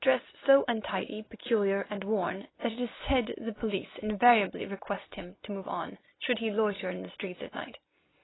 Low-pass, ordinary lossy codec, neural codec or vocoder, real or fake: 7.2 kHz; AAC, 16 kbps; none; real